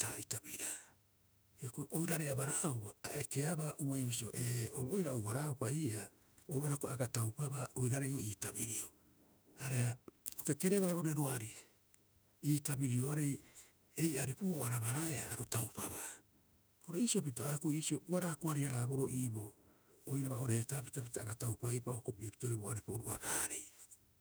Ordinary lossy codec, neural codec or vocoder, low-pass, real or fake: none; autoencoder, 48 kHz, 32 numbers a frame, DAC-VAE, trained on Japanese speech; none; fake